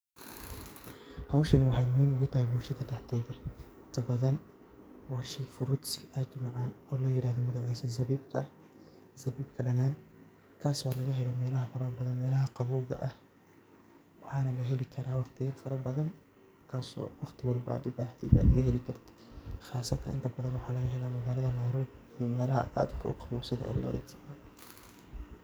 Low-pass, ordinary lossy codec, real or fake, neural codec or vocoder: none; none; fake; codec, 44.1 kHz, 2.6 kbps, SNAC